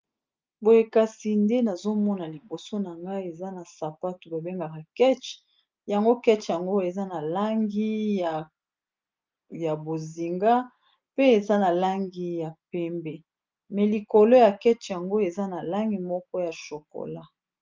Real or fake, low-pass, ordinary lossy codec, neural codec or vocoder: real; 7.2 kHz; Opus, 32 kbps; none